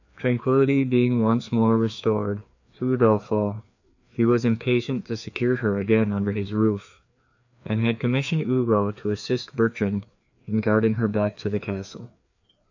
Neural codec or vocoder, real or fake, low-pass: codec, 16 kHz, 2 kbps, FreqCodec, larger model; fake; 7.2 kHz